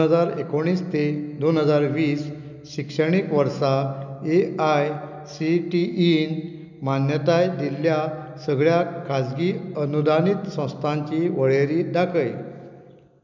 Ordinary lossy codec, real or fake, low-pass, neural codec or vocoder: none; real; 7.2 kHz; none